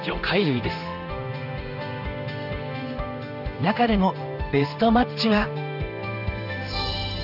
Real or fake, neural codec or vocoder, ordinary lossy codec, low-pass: fake; codec, 16 kHz in and 24 kHz out, 1 kbps, XY-Tokenizer; none; 5.4 kHz